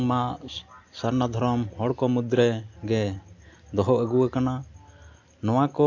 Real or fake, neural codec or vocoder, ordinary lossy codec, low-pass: real; none; AAC, 48 kbps; 7.2 kHz